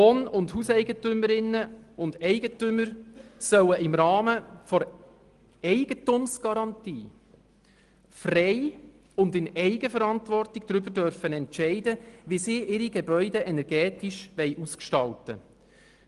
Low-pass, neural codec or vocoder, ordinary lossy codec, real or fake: 10.8 kHz; none; Opus, 24 kbps; real